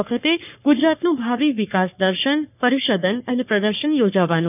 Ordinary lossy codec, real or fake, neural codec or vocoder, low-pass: none; fake; codec, 44.1 kHz, 3.4 kbps, Pupu-Codec; 3.6 kHz